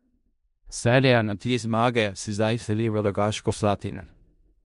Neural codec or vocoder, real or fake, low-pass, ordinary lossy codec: codec, 16 kHz in and 24 kHz out, 0.4 kbps, LongCat-Audio-Codec, four codebook decoder; fake; 10.8 kHz; MP3, 64 kbps